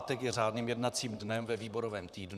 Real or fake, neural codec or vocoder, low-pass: fake; vocoder, 44.1 kHz, 128 mel bands, Pupu-Vocoder; 14.4 kHz